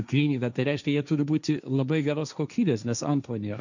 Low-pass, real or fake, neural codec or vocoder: 7.2 kHz; fake; codec, 16 kHz, 1.1 kbps, Voila-Tokenizer